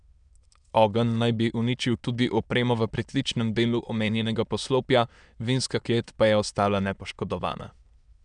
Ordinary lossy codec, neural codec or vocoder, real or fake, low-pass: none; autoencoder, 22.05 kHz, a latent of 192 numbers a frame, VITS, trained on many speakers; fake; 9.9 kHz